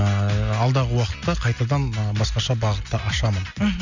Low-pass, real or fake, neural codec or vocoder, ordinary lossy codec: 7.2 kHz; real; none; none